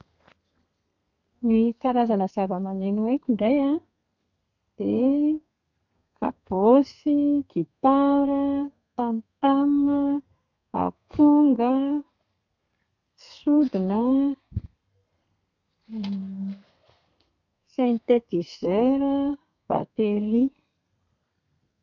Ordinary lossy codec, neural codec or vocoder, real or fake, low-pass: none; codec, 32 kHz, 1.9 kbps, SNAC; fake; 7.2 kHz